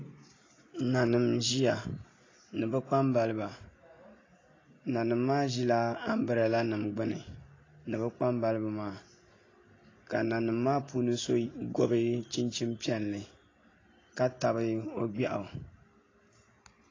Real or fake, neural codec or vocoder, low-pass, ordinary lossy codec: real; none; 7.2 kHz; AAC, 32 kbps